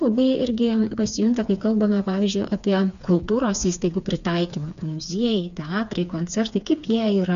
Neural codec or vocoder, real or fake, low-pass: codec, 16 kHz, 4 kbps, FreqCodec, smaller model; fake; 7.2 kHz